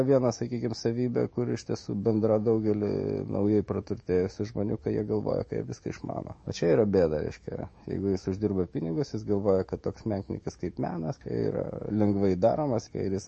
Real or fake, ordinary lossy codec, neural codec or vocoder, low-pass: fake; MP3, 32 kbps; autoencoder, 48 kHz, 128 numbers a frame, DAC-VAE, trained on Japanese speech; 10.8 kHz